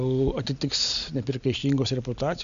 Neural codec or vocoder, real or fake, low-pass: none; real; 7.2 kHz